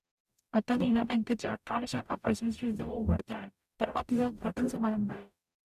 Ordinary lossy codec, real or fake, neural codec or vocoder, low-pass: none; fake; codec, 44.1 kHz, 0.9 kbps, DAC; 14.4 kHz